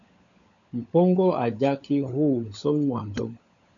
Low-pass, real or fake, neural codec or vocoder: 7.2 kHz; fake; codec, 16 kHz, 16 kbps, FunCodec, trained on LibriTTS, 50 frames a second